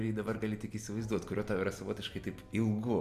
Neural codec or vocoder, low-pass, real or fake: none; 14.4 kHz; real